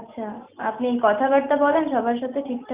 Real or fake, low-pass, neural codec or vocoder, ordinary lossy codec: real; 3.6 kHz; none; Opus, 64 kbps